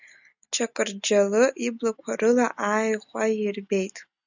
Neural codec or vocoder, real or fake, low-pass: none; real; 7.2 kHz